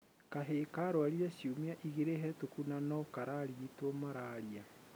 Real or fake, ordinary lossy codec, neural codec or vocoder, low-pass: real; none; none; none